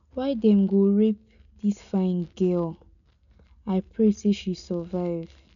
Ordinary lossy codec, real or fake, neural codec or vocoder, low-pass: none; real; none; 7.2 kHz